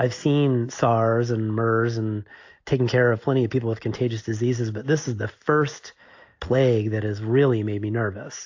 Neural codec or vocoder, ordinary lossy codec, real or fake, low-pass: none; AAC, 48 kbps; real; 7.2 kHz